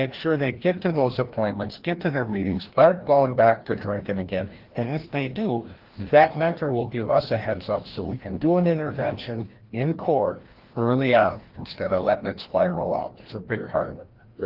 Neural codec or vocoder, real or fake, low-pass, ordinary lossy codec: codec, 16 kHz, 1 kbps, FreqCodec, larger model; fake; 5.4 kHz; Opus, 16 kbps